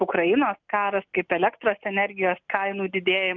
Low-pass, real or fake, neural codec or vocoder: 7.2 kHz; real; none